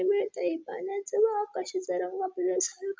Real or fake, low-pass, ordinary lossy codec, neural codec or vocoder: real; none; none; none